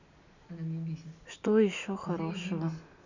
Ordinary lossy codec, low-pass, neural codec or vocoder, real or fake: none; 7.2 kHz; none; real